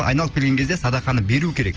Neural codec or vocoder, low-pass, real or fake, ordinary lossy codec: none; 7.2 kHz; real; Opus, 24 kbps